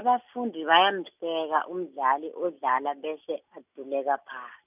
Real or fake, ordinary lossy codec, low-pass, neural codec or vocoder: real; none; 3.6 kHz; none